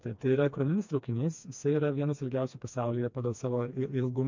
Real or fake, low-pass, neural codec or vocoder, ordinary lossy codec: fake; 7.2 kHz; codec, 16 kHz, 2 kbps, FreqCodec, smaller model; MP3, 48 kbps